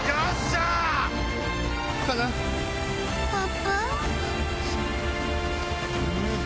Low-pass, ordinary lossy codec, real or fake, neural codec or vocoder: none; none; real; none